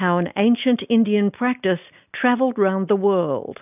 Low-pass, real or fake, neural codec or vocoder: 3.6 kHz; real; none